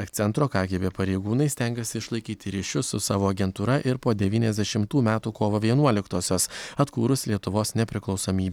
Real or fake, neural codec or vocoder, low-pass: real; none; 19.8 kHz